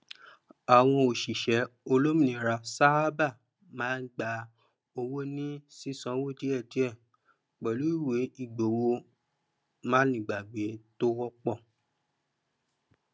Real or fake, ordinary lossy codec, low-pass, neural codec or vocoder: real; none; none; none